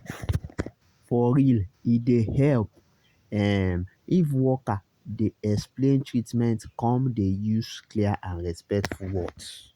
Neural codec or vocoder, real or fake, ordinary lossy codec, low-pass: none; real; Opus, 64 kbps; 19.8 kHz